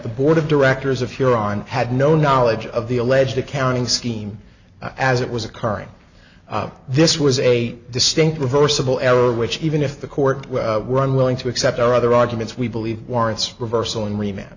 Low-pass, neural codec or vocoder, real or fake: 7.2 kHz; none; real